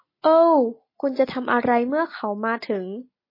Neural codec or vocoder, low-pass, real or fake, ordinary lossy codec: none; 5.4 kHz; real; MP3, 24 kbps